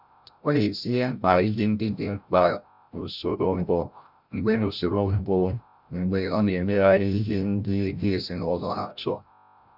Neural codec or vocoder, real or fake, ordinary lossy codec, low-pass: codec, 16 kHz, 0.5 kbps, FreqCodec, larger model; fake; none; 5.4 kHz